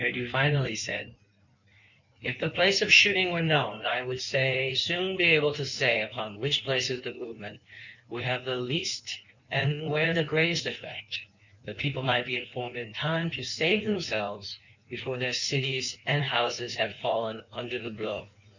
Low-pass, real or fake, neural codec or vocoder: 7.2 kHz; fake; codec, 16 kHz in and 24 kHz out, 1.1 kbps, FireRedTTS-2 codec